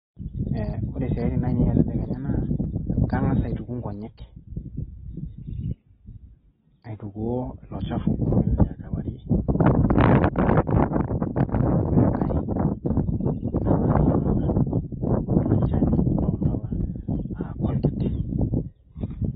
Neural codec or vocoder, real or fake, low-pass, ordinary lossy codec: none; real; 19.8 kHz; AAC, 16 kbps